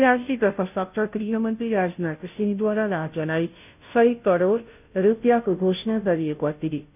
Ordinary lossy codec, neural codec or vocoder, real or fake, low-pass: none; codec, 16 kHz, 0.5 kbps, FunCodec, trained on Chinese and English, 25 frames a second; fake; 3.6 kHz